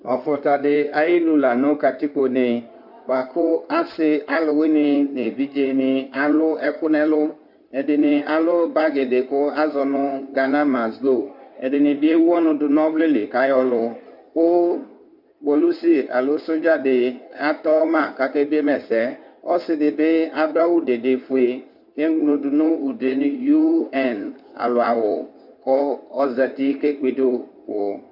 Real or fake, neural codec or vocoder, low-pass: fake; codec, 16 kHz in and 24 kHz out, 2.2 kbps, FireRedTTS-2 codec; 5.4 kHz